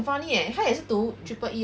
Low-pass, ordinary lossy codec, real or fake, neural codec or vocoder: none; none; real; none